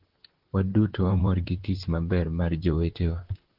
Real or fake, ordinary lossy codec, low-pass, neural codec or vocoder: fake; Opus, 16 kbps; 5.4 kHz; vocoder, 44.1 kHz, 128 mel bands, Pupu-Vocoder